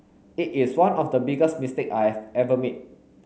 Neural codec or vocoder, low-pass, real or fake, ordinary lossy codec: none; none; real; none